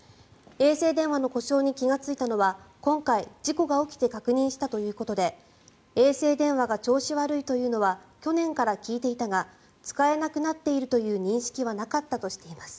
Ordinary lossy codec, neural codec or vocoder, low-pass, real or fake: none; none; none; real